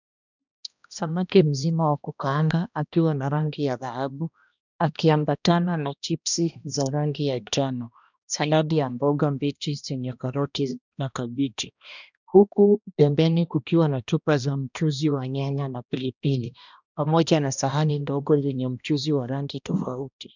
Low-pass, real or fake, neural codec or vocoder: 7.2 kHz; fake; codec, 16 kHz, 1 kbps, X-Codec, HuBERT features, trained on balanced general audio